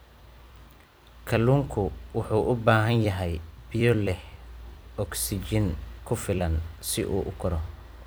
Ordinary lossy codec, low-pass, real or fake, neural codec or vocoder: none; none; fake; vocoder, 44.1 kHz, 128 mel bands every 256 samples, BigVGAN v2